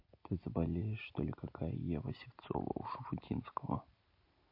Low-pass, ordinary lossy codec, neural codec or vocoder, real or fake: 5.4 kHz; MP3, 32 kbps; none; real